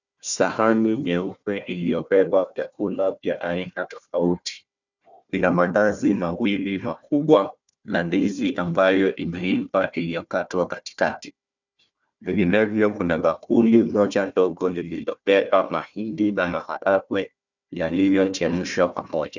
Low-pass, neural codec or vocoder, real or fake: 7.2 kHz; codec, 16 kHz, 1 kbps, FunCodec, trained on Chinese and English, 50 frames a second; fake